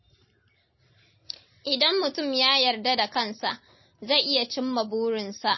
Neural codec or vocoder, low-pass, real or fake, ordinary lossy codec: none; 7.2 kHz; real; MP3, 24 kbps